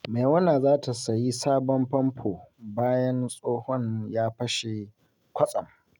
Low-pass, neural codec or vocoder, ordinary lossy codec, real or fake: 19.8 kHz; none; none; real